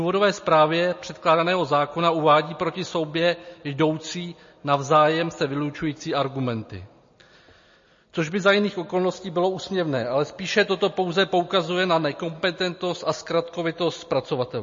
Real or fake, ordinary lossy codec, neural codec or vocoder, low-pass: real; MP3, 32 kbps; none; 7.2 kHz